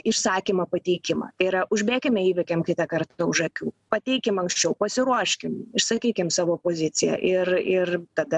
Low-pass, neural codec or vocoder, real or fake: 10.8 kHz; none; real